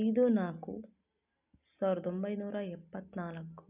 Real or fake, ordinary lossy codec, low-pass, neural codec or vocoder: real; none; 3.6 kHz; none